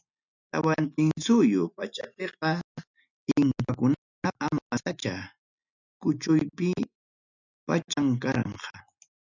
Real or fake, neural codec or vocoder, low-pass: real; none; 7.2 kHz